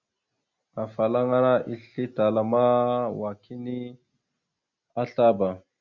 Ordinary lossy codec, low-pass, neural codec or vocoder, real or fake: Opus, 64 kbps; 7.2 kHz; none; real